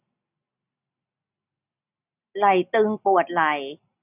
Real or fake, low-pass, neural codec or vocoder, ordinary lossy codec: real; 3.6 kHz; none; none